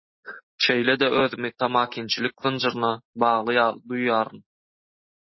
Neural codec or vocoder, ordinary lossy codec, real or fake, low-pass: none; MP3, 24 kbps; real; 7.2 kHz